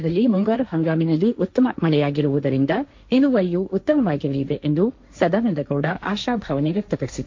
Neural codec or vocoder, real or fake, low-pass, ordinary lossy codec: codec, 16 kHz, 1.1 kbps, Voila-Tokenizer; fake; 7.2 kHz; MP3, 48 kbps